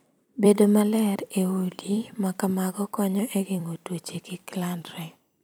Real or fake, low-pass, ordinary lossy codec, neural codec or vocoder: fake; none; none; vocoder, 44.1 kHz, 128 mel bands every 256 samples, BigVGAN v2